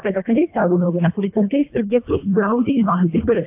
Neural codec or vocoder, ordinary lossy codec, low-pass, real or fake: codec, 24 kHz, 1.5 kbps, HILCodec; AAC, 32 kbps; 3.6 kHz; fake